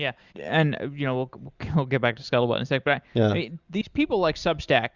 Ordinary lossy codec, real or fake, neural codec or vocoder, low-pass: Opus, 64 kbps; real; none; 7.2 kHz